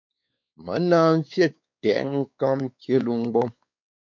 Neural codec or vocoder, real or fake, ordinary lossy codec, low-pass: codec, 16 kHz, 4 kbps, X-Codec, WavLM features, trained on Multilingual LibriSpeech; fake; MP3, 48 kbps; 7.2 kHz